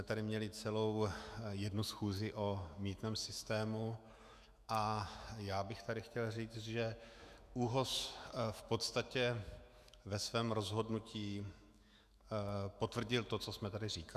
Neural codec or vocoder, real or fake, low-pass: autoencoder, 48 kHz, 128 numbers a frame, DAC-VAE, trained on Japanese speech; fake; 14.4 kHz